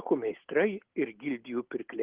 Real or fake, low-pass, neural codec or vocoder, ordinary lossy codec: real; 3.6 kHz; none; Opus, 16 kbps